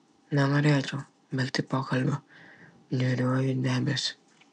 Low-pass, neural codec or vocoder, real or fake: 9.9 kHz; none; real